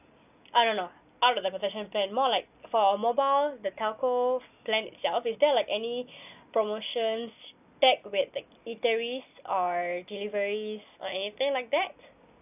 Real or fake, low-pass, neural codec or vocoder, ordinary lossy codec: real; 3.6 kHz; none; none